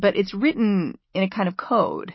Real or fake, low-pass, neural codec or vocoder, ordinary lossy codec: fake; 7.2 kHz; autoencoder, 48 kHz, 128 numbers a frame, DAC-VAE, trained on Japanese speech; MP3, 24 kbps